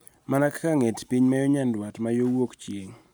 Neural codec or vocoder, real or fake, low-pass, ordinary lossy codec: none; real; none; none